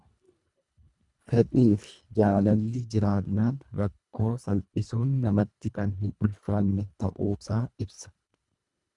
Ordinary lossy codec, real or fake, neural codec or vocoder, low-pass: Opus, 64 kbps; fake; codec, 24 kHz, 1.5 kbps, HILCodec; 10.8 kHz